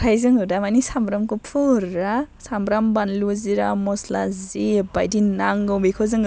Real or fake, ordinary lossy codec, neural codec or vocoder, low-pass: real; none; none; none